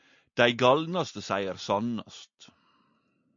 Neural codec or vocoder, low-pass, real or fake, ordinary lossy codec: none; 7.2 kHz; real; MP3, 48 kbps